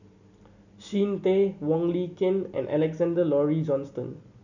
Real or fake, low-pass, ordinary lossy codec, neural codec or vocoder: real; 7.2 kHz; none; none